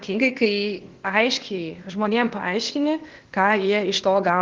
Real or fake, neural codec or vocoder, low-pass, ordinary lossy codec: fake; codec, 16 kHz, 0.8 kbps, ZipCodec; 7.2 kHz; Opus, 16 kbps